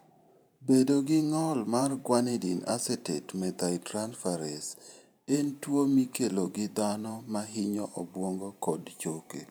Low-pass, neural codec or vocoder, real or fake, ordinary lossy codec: none; vocoder, 44.1 kHz, 128 mel bands every 512 samples, BigVGAN v2; fake; none